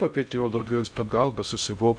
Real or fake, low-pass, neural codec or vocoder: fake; 9.9 kHz; codec, 16 kHz in and 24 kHz out, 0.6 kbps, FocalCodec, streaming, 2048 codes